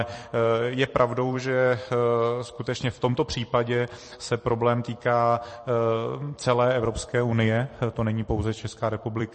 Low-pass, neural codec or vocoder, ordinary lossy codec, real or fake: 10.8 kHz; vocoder, 44.1 kHz, 128 mel bands every 256 samples, BigVGAN v2; MP3, 32 kbps; fake